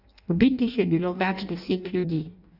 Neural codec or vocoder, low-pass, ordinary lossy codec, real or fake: codec, 16 kHz in and 24 kHz out, 0.6 kbps, FireRedTTS-2 codec; 5.4 kHz; none; fake